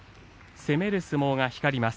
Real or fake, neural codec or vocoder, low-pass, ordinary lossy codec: real; none; none; none